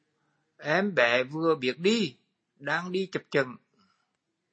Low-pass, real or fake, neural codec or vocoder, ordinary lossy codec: 9.9 kHz; real; none; MP3, 32 kbps